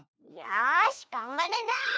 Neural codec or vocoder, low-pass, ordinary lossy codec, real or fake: codec, 16 kHz, 1 kbps, FunCodec, trained on LibriTTS, 50 frames a second; none; none; fake